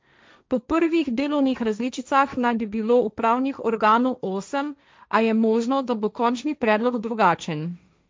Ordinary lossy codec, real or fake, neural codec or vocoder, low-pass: none; fake; codec, 16 kHz, 1.1 kbps, Voila-Tokenizer; none